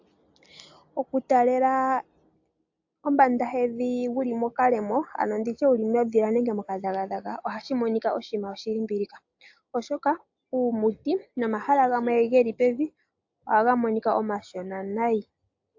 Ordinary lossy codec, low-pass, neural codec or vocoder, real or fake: AAC, 48 kbps; 7.2 kHz; none; real